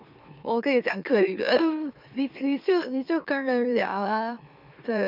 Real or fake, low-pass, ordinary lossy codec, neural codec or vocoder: fake; 5.4 kHz; none; autoencoder, 44.1 kHz, a latent of 192 numbers a frame, MeloTTS